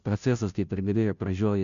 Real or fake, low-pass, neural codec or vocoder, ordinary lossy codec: fake; 7.2 kHz; codec, 16 kHz, 0.5 kbps, FunCodec, trained on Chinese and English, 25 frames a second; MP3, 96 kbps